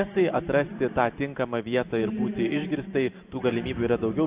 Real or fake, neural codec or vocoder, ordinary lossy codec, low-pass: fake; vocoder, 44.1 kHz, 128 mel bands every 512 samples, BigVGAN v2; Opus, 64 kbps; 3.6 kHz